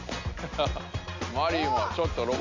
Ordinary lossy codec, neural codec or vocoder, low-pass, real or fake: MP3, 48 kbps; none; 7.2 kHz; real